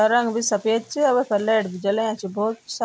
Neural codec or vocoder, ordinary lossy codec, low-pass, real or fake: none; none; none; real